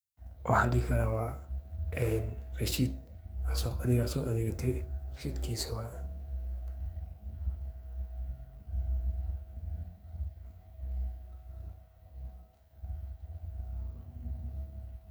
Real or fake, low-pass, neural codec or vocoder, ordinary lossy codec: fake; none; codec, 44.1 kHz, 2.6 kbps, SNAC; none